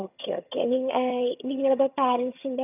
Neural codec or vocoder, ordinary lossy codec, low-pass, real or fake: vocoder, 22.05 kHz, 80 mel bands, HiFi-GAN; none; 3.6 kHz; fake